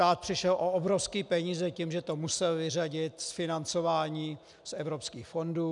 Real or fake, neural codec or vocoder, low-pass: real; none; 10.8 kHz